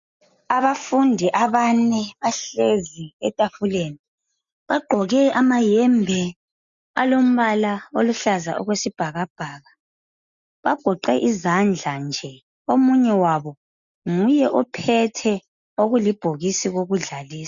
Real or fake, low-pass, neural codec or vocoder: real; 7.2 kHz; none